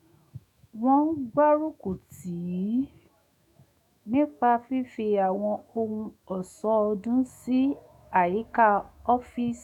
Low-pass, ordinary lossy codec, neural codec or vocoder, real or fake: 19.8 kHz; none; autoencoder, 48 kHz, 128 numbers a frame, DAC-VAE, trained on Japanese speech; fake